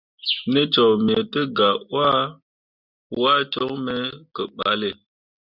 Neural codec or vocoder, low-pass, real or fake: none; 5.4 kHz; real